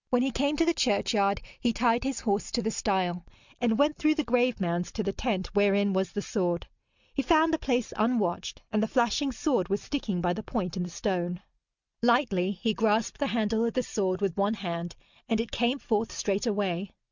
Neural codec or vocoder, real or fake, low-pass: none; real; 7.2 kHz